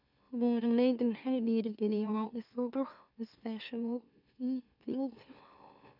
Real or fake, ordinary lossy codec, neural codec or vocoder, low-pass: fake; none; autoencoder, 44.1 kHz, a latent of 192 numbers a frame, MeloTTS; 5.4 kHz